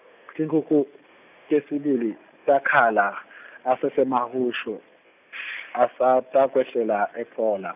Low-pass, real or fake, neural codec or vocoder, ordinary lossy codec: 3.6 kHz; real; none; none